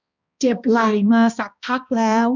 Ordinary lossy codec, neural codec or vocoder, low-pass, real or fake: none; codec, 16 kHz, 1 kbps, X-Codec, HuBERT features, trained on balanced general audio; 7.2 kHz; fake